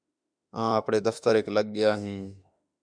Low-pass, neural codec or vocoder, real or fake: 9.9 kHz; autoencoder, 48 kHz, 32 numbers a frame, DAC-VAE, trained on Japanese speech; fake